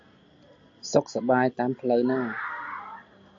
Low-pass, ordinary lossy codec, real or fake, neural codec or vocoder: 7.2 kHz; AAC, 48 kbps; real; none